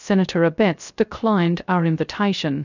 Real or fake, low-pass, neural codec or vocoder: fake; 7.2 kHz; codec, 16 kHz, 0.3 kbps, FocalCodec